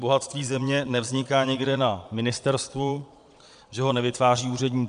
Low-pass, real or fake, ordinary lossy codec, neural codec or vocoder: 9.9 kHz; fake; MP3, 96 kbps; vocoder, 22.05 kHz, 80 mel bands, Vocos